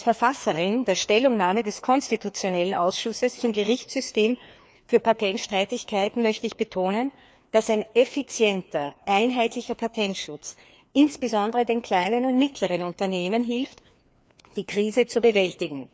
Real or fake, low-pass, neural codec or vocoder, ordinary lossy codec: fake; none; codec, 16 kHz, 2 kbps, FreqCodec, larger model; none